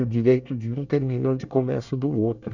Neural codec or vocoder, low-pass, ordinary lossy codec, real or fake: codec, 24 kHz, 1 kbps, SNAC; 7.2 kHz; none; fake